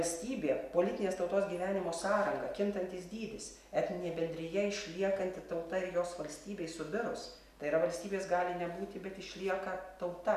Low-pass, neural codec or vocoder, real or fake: 14.4 kHz; none; real